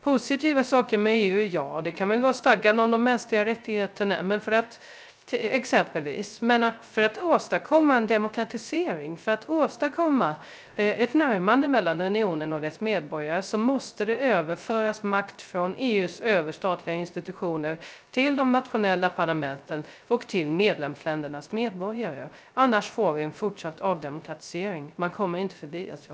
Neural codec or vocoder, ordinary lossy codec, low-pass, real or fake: codec, 16 kHz, 0.3 kbps, FocalCodec; none; none; fake